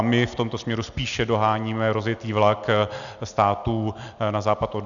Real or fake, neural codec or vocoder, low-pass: real; none; 7.2 kHz